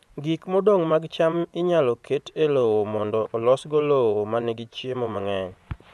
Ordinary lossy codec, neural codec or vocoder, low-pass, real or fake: none; vocoder, 24 kHz, 100 mel bands, Vocos; none; fake